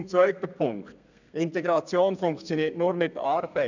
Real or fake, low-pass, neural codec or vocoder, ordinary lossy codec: fake; 7.2 kHz; codec, 32 kHz, 1.9 kbps, SNAC; none